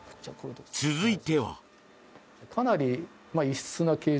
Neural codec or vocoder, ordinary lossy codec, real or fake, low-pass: none; none; real; none